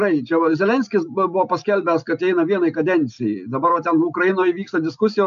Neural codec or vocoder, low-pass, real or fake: none; 7.2 kHz; real